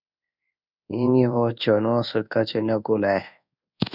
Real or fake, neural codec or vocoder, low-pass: fake; codec, 24 kHz, 1.2 kbps, DualCodec; 5.4 kHz